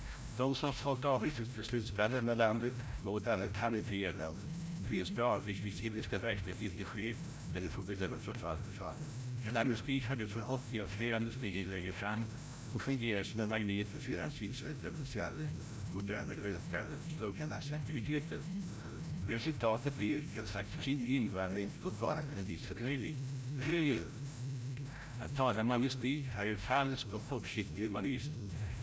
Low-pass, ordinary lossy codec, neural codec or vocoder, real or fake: none; none; codec, 16 kHz, 0.5 kbps, FreqCodec, larger model; fake